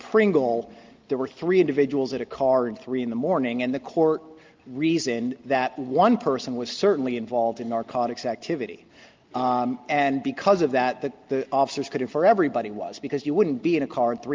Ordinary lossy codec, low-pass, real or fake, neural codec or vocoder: Opus, 32 kbps; 7.2 kHz; real; none